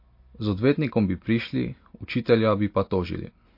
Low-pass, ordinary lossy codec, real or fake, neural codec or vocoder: 5.4 kHz; MP3, 32 kbps; real; none